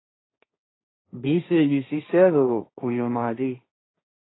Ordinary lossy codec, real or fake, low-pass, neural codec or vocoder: AAC, 16 kbps; fake; 7.2 kHz; codec, 16 kHz, 1.1 kbps, Voila-Tokenizer